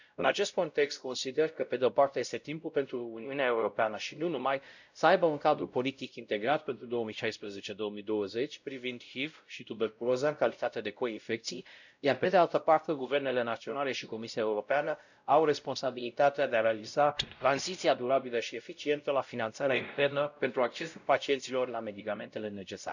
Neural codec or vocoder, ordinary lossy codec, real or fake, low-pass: codec, 16 kHz, 0.5 kbps, X-Codec, WavLM features, trained on Multilingual LibriSpeech; none; fake; 7.2 kHz